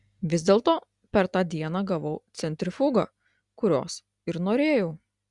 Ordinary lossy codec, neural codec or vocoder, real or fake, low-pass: Opus, 64 kbps; none; real; 10.8 kHz